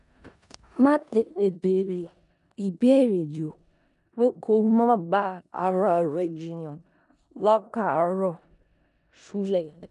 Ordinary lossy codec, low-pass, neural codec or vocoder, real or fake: none; 10.8 kHz; codec, 16 kHz in and 24 kHz out, 0.4 kbps, LongCat-Audio-Codec, four codebook decoder; fake